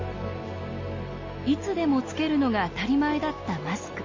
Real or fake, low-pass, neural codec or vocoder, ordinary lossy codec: real; 7.2 kHz; none; MP3, 32 kbps